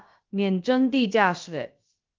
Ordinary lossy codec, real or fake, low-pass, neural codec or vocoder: Opus, 32 kbps; fake; 7.2 kHz; codec, 16 kHz, about 1 kbps, DyCAST, with the encoder's durations